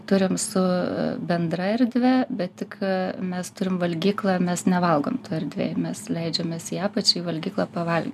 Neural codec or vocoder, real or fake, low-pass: none; real; 14.4 kHz